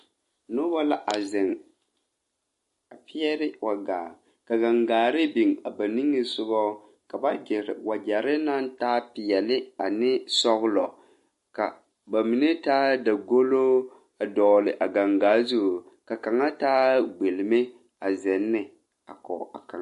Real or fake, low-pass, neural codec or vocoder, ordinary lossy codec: real; 14.4 kHz; none; MP3, 48 kbps